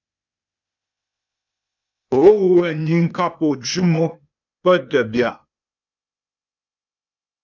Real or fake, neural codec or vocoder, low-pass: fake; codec, 16 kHz, 0.8 kbps, ZipCodec; 7.2 kHz